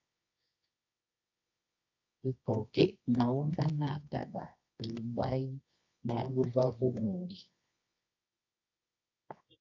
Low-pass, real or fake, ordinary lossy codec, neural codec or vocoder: 7.2 kHz; fake; AAC, 48 kbps; codec, 24 kHz, 0.9 kbps, WavTokenizer, medium music audio release